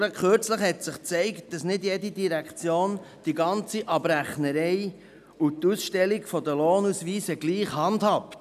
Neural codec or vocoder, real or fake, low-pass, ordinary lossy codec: none; real; 14.4 kHz; none